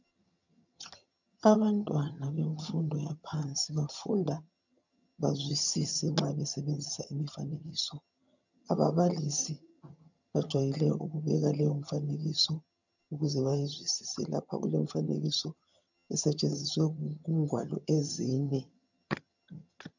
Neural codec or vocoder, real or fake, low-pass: vocoder, 22.05 kHz, 80 mel bands, HiFi-GAN; fake; 7.2 kHz